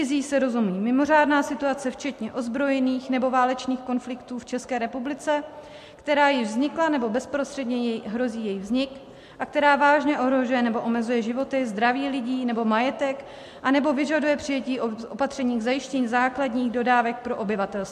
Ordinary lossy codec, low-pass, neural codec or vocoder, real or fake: MP3, 64 kbps; 14.4 kHz; none; real